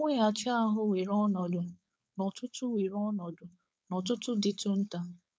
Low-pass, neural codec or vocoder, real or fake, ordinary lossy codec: none; codec, 16 kHz, 4.8 kbps, FACodec; fake; none